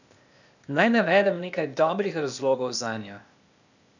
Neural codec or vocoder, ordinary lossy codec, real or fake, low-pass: codec, 16 kHz, 0.8 kbps, ZipCodec; none; fake; 7.2 kHz